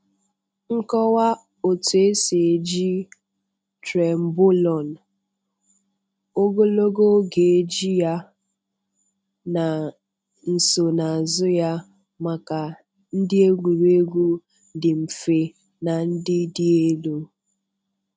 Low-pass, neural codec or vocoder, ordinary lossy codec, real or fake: none; none; none; real